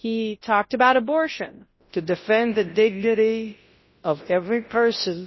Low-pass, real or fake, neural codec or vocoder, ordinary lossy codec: 7.2 kHz; fake; codec, 24 kHz, 0.9 kbps, WavTokenizer, large speech release; MP3, 24 kbps